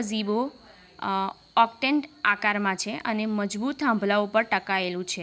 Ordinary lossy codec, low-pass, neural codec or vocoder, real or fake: none; none; none; real